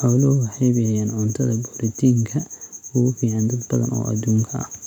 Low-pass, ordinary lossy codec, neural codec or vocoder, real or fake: 19.8 kHz; none; none; real